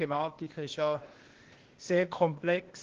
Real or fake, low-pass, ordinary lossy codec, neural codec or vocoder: fake; 7.2 kHz; Opus, 16 kbps; codec, 16 kHz, 0.8 kbps, ZipCodec